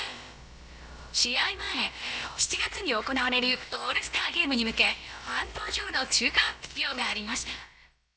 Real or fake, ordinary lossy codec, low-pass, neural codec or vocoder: fake; none; none; codec, 16 kHz, about 1 kbps, DyCAST, with the encoder's durations